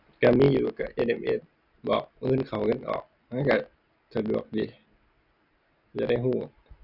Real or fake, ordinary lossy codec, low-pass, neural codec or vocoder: real; none; 5.4 kHz; none